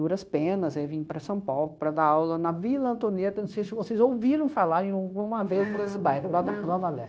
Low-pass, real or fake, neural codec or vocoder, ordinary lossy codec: none; fake; codec, 16 kHz, 0.9 kbps, LongCat-Audio-Codec; none